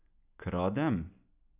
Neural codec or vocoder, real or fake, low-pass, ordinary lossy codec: none; real; 3.6 kHz; none